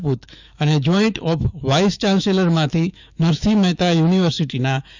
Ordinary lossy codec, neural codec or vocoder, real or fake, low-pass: none; autoencoder, 48 kHz, 128 numbers a frame, DAC-VAE, trained on Japanese speech; fake; 7.2 kHz